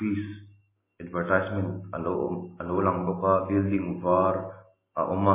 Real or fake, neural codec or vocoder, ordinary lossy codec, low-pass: real; none; MP3, 16 kbps; 3.6 kHz